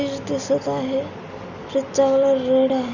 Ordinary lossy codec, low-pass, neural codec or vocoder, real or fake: none; 7.2 kHz; none; real